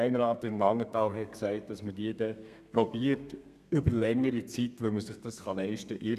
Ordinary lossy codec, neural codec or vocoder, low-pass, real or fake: none; codec, 32 kHz, 1.9 kbps, SNAC; 14.4 kHz; fake